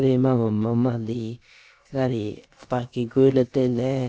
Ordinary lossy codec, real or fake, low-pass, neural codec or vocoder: none; fake; none; codec, 16 kHz, about 1 kbps, DyCAST, with the encoder's durations